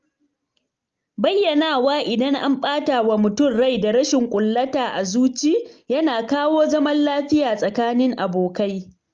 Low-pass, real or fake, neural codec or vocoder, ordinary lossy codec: 7.2 kHz; real; none; Opus, 24 kbps